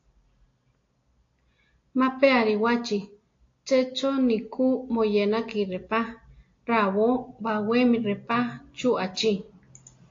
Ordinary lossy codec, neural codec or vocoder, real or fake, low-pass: AAC, 48 kbps; none; real; 7.2 kHz